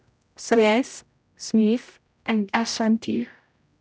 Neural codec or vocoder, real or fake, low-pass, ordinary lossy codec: codec, 16 kHz, 0.5 kbps, X-Codec, HuBERT features, trained on general audio; fake; none; none